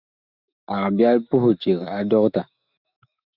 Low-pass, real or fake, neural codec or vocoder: 5.4 kHz; fake; autoencoder, 48 kHz, 128 numbers a frame, DAC-VAE, trained on Japanese speech